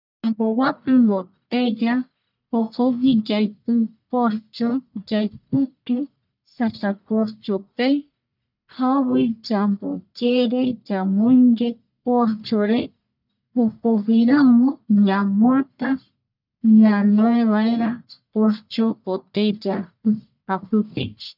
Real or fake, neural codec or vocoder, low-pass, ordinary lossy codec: fake; codec, 44.1 kHz, 1.7 kbps, Pupu-Codec; 5.4 kHz; none